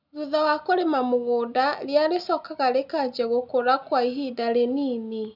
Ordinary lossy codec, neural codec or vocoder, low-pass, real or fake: none; none; 5.4 kHz; real